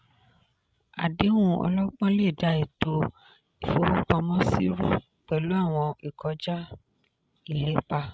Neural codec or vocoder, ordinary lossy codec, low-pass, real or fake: codec, 16 kHz, 16 kbps, FreqCodec, larger model; none; none; fake